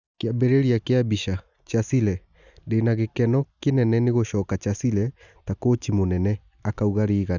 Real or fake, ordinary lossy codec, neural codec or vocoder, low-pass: real; none; none; 7.2 kHz